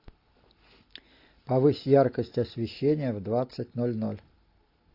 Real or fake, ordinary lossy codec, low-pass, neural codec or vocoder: real; AAC, 32 kbps; 5.4 kHz; none